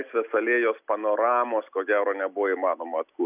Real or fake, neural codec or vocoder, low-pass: real; none; 3.6 kHz